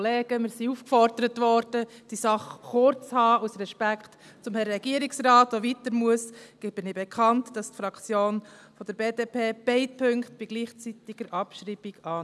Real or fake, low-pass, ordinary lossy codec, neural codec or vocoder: real; none; none; none